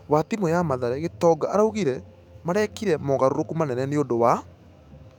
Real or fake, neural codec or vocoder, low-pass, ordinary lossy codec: fake; autoencoder, 48 kHz, 128 numbers a frame, DAC-VAE, trained on Japanese speech; 19.8 kHz; none